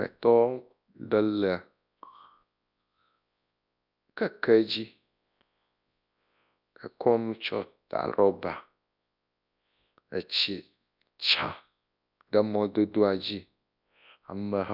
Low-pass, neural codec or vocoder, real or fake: 5.4 kHz; codec, 24 kHz, 0.9 kbps, WavTokenizer, large speech release; fake